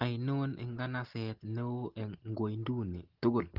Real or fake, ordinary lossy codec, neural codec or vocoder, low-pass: real; Opus, 16 kbps; none; 5.4 kHz